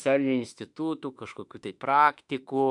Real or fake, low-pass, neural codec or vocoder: fake; 10.8 kHz; autoencoder, 48 kHz, 32 numbers a frame, DAC-VAE, trained on Japanese speech